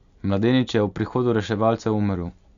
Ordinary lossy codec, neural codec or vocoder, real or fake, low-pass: Opus, 64 kbps; none; real; 7.2 kHz